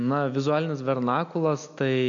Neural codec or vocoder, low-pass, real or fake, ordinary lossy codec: none; 7.2 kHz; real; AAC, 64 kbps